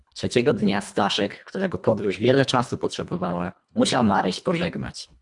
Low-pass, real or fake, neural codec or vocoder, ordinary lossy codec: 10.8 kHz; fake; codec, 24 kHz, 1.5 kbps, HILCodec; MP3, 96 kbps